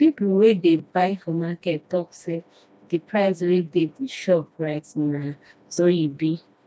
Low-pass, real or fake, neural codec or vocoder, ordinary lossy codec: none; fake; codec, 16 kHz, 1 kbps, FreqCodec, smaller model; none